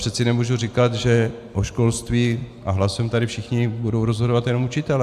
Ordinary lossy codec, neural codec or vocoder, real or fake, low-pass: AAC, 96 kbps; none; real; 14.4 kHz